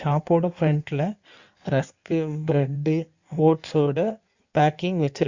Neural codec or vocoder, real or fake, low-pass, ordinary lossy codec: codec, 16 kHz in and 24 kHz out, 1.1 kbps, FireRedTTS-2 codec; fake; 7.2 kHz; Opus, 64 kbps